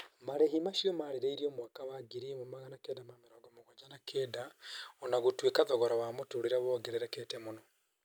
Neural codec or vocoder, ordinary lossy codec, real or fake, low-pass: none; none; real; none